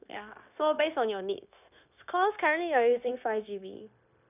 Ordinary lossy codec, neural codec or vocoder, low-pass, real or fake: none; codec, 16 kHz, 0.9 kbps, LongCat-Audio-Codec; 3.6 kHz; fake